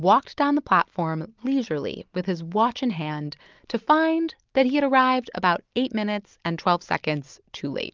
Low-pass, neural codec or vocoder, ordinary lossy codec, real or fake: 7.2 kHz; none; Opus, 24 kbps; real